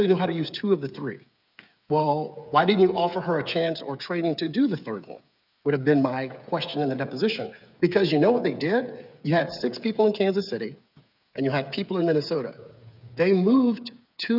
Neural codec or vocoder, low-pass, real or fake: codec, 16 kHz, 8 kbps, FreqCodec, smaller model; 5.4 kHz; fake